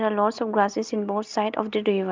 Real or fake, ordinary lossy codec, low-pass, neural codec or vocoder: real; Opus, 24 kbps; 7.2 kHz; none